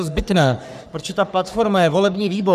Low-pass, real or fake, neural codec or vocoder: 14.4 kHz; fake; codec, 44.1 kHz, 3.4 kbps, Pupu-Codec